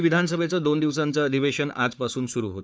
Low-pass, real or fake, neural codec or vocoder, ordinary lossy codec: none; fake; codec, 16 kHz, 4 kbps, FunCodec, trained on Chinese and English, 50 frames a second; none